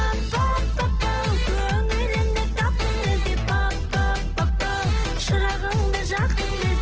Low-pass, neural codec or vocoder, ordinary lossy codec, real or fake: 7.2 kHz; none; Opus, 16 kbps; real